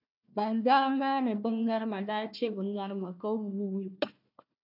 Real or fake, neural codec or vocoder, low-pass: fake; codec, 16 kHz, 1 kbps, FunCodec, trained on Chinese and English, 50 frames a second; 5.4 kHz